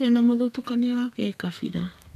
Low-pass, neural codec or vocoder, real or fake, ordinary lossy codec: 14.4 kHz; codec, 32 kHz, 1.9 kbps, SNAC; fake; none